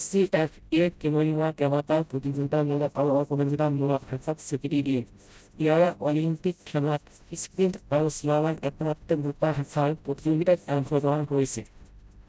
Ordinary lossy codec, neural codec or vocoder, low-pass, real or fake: none; codec, 16 kHz, 0.5 kbps, FreqCodec, smaller model; none; fake